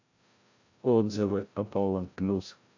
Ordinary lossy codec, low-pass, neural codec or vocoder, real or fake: AAC, 48 kbps; 7.2 kHz; codec, 16 kHz, 0.5 kbps, FreqCodec, larger model; fake